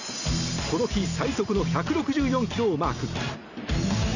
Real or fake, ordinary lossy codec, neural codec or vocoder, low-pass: real; none; none; 7.2 kHz